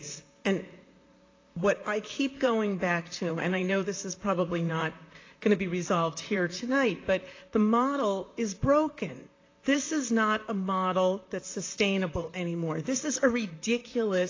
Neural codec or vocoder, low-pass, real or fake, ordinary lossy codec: vocoder, 44.1 kHz, 128 mel bands, Pupu-Vocoder; 7.2 kHz; fake; AAC, 32 kbps